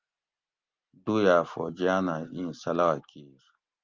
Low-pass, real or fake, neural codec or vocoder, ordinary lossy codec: 7.2 kHz; real; none; Opus, 32 kbps